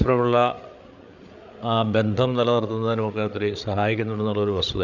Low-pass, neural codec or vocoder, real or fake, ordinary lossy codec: 7.2 kHz; codec, 16 kHz, 8 kbps, FreqCodec, larger model; fake; none